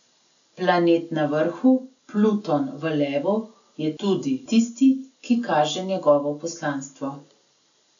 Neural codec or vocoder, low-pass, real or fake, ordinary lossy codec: none; 7.2 kHz; real; none